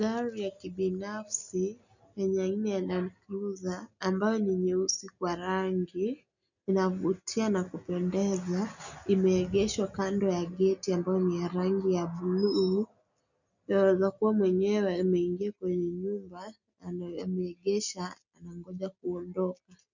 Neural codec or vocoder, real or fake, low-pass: none; real; 7.2 kHz